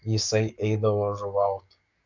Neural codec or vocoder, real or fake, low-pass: codec, 44.1 kHz, 7.8 kbps, DAC; fake; 7.2 kHz